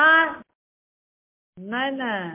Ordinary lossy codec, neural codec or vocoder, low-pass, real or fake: MP3, 24 kbps; none; 3.6 kHz; real